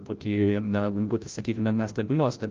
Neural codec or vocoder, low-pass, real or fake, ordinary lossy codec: codec, 16 kHz, 0.5 kbps, FreqCodec, larger model; 7.2 kHz; fake; Opus, 32 kbps